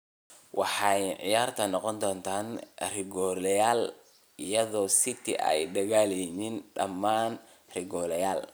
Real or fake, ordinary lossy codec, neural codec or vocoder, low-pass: real; none; none; none